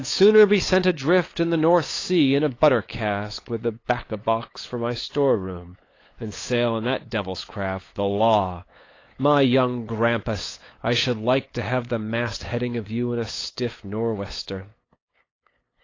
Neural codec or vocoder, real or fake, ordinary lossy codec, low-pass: codec, 16 kHz, 8 kbps, FunCodec, trained on LibriTTS, 25 frames a second; fake; AAC, 32 kbps; 7.2 kHz